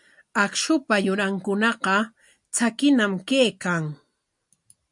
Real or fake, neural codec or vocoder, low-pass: real; none; 10.8 kHz